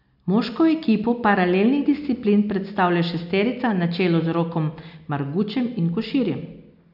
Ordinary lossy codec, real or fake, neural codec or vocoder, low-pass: none; real; none; 5.4 kHz